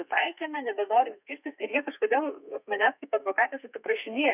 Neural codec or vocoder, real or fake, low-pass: codec, 32 kHz, 1.9 kbps, SNAC; fake; 3.6 kHz